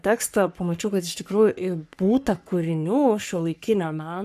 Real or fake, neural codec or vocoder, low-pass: fake; codec, 44.1 kHz, 3.4 kbps, Pupu-Codec; 14.4 kHz